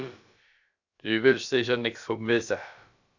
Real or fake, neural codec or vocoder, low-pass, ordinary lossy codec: fake; codec, 16 kHz, about 1 kbps, DyCAST, with the encoder's durations; 7.2 kHz; Opus, 64 kbps